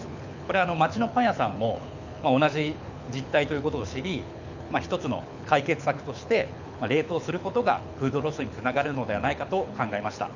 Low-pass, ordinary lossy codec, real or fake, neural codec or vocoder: 7.2 kHz; none; fake; codec, 24 kHz, 6 kbps, HILCodec